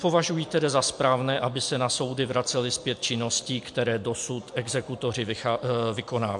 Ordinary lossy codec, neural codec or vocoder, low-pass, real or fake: MP3, 64 kbps; none; 10.8 kHz; real